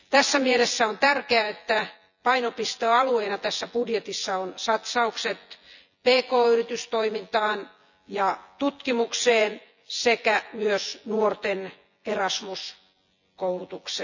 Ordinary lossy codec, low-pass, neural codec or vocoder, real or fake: none; 7.2 kHz; vocoder, 24 kHz, 100 mel bands, Vocos; fake